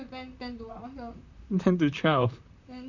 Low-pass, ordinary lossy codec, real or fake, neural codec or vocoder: 7.2 kHz; none; fake; vocoder, 44.1 kHz, 128 mel bands, Pupu-Vocoder